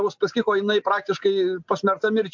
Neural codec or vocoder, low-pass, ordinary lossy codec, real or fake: none; 7.2 kHz; MP3, 64 kbps; real